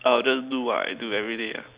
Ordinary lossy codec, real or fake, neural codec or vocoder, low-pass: Opus, 64 kbps; real; none; 3.6 kHz